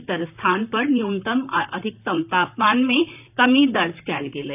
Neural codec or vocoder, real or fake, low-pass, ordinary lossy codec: vocoder, 44.1 kHz, 128 mel bands, Pupu-Vocoder; fake; 3.6 kHz; none